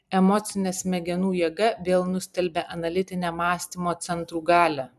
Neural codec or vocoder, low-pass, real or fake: vocoder, 44.1 kHz, 128 mel bands every 256 samples, BigVGAN v2; 14.4 kHz; fake